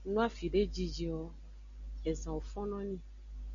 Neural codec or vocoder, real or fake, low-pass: none; real; 7.2 kHz